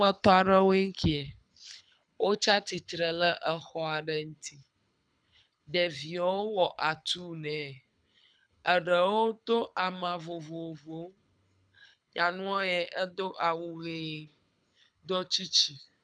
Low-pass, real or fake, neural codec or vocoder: 9.9 kHz; fake; codec, 24 kHz, 6 kbps, HILCodec